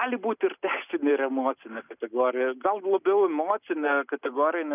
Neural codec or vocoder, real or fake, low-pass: none; real; 3.6 kHz